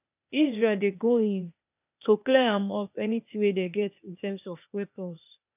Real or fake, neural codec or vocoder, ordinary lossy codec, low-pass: fake; codec, 16 kHz, 0.8 kbps, ZipCodec; none; 3.6 kHz